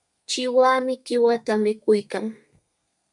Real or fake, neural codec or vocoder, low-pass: fake; codec, 32 kHz, 1.9 kbps, SNAC; 10.8 kHz